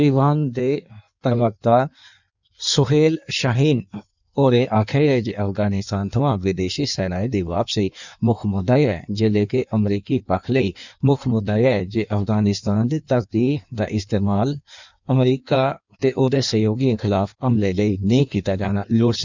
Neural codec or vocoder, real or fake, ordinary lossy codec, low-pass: codec, 16 kHz in and 24 kHz out, 1.1 kbps, FireRedTTS-2 codec; fake; none; 7.2 kHz